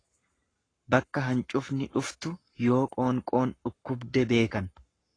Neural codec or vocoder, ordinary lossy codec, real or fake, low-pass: codec, 44.1 kHz, 7.8 kbps, Pupu-Codec; AAC, 32 kbps; fake; 9.9 kHz